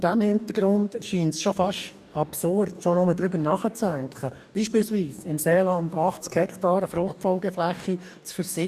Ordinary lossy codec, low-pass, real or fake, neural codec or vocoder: none; 14.4 kHz; fake; codec, 44.1 kHz, 2.6 kbps, DAC